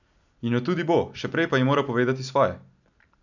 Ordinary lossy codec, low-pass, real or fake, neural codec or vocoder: none; 7.2 kHz; real; none